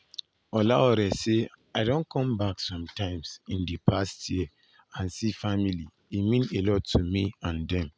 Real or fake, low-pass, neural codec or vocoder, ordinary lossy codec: real; none; none; none